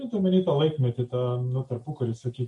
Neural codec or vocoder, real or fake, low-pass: none; real; 10.8 kHz